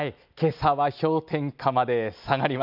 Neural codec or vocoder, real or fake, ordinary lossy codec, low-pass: none; real; none; 5.4 kHz